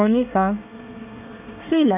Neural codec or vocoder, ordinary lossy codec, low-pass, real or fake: codec, 24 kHz, 1 kbps, SNAC; none; 3.6 kHz; fake